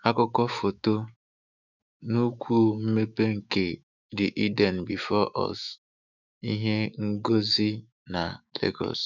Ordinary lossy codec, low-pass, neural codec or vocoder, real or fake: none; 7.2 kHz; autoencoder, 48 kHz, 128 numbers a frame, DAC-VAE, trained on Japanese speech; fake